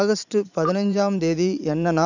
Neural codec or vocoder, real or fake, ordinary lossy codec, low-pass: vocoder, 44.1 kHz, 128 mel bands every 512 samples, BigVGAN v2; fake; none; 7.2 kHz